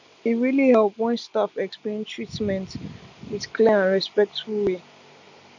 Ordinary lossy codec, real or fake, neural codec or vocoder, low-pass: none; real; none; 7.2 kHz